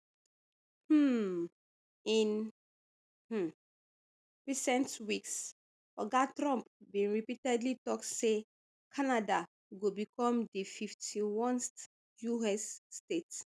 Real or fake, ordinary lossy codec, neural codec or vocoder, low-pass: real; none; none; none